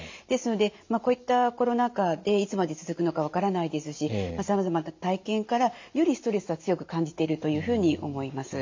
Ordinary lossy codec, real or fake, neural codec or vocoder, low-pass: MP3, 64 kbps; real; none; 7.2 kHz